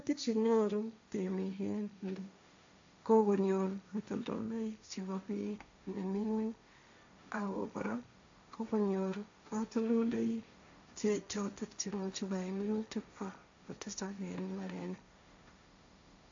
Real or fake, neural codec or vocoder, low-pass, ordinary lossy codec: fake; codec, 16 kHz, 1.1 kbps, Voila-Tokenizer; 7.2 kHz; AAC, 64 kbps